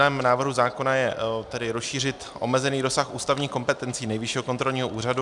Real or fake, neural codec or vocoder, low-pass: real; none; 10.8 kHz